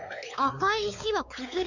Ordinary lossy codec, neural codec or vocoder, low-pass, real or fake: none; codec, 16 kHz, 4 kbps, X-Codec, WavLM features, trained on Multilingual LibriSpeech; 7.2 kHz; fake